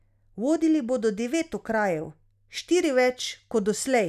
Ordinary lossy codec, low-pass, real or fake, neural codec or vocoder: none; 14.4 kHz; real; none